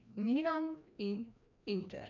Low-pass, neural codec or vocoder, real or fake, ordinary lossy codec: 7.2 kHz; codec, 16 kHz, 1 kbps, FreqCodec, larger model; fake; none